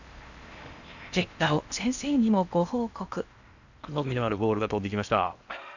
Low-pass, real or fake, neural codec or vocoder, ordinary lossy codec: 7.2 kHz; fake; codec, 16 kHz in and 24 kHz out, 0.8 kbps, FocalCodec, streaming, 65536 codes; none